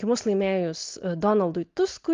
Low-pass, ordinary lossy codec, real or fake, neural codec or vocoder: 7.2 kHz; Opus, 32 kbps; real; none